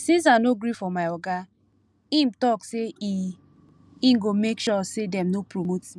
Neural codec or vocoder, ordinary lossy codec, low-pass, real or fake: none; none; none; real